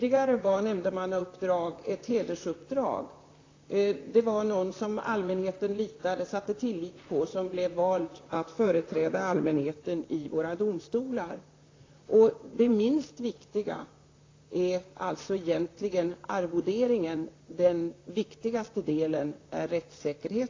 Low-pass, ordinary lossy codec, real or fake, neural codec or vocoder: 7.2 kHz; AAC, 32 kbps; fake; vocoder, 44.1 kHz, 128 mel bands, Pupu-Vocoder